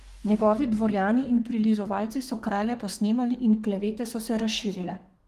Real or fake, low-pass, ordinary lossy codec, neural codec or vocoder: fake; 14.4 kHz; Opus, 24 kbps; codec, 32 kHz, 1.9 kbps, SNAC